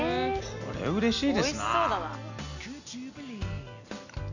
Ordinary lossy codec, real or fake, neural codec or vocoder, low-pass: none; real; none; 7.2 kHz